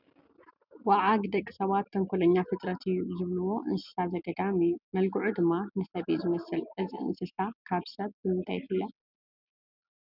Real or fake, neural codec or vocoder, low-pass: real; none; 5.4 kHz